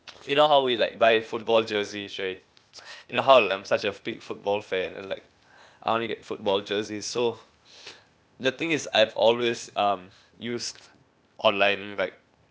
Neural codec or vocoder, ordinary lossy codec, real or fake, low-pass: codec, 16 kHz, 0.8 kbps, ZipCodec; none; fake; none